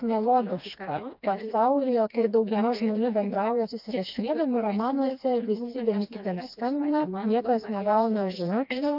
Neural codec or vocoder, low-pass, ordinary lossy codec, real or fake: codec, 16 kHz, 2 kbps, FreqCodec, smaller model; 5.4 kHz; AAC, 48 kbps; fake